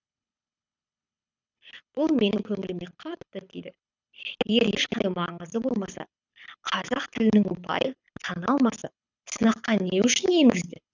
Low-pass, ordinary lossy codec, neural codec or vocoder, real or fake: 7.2 kHz; none; codec, 24 kHz, 6 kbps, HILCodec; fake